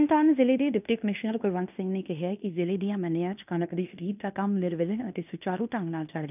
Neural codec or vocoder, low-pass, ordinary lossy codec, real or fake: codec, 16 kHz in and 24 kHz out, 0.9 kbps, LongCat-Audio-Codec, fine tuned four codebook decoder; 3.6 kHz; none; fake